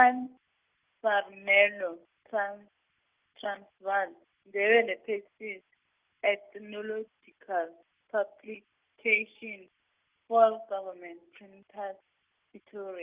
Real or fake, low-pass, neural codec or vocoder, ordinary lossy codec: real; 3.6 kHz; none; Opus, 32 kbps